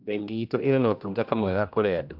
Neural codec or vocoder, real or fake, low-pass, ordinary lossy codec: codec, 16 kHz, 1 kbps, X-Codec, HuBERT features, trained on general audio; fake; 5.4 kHz; none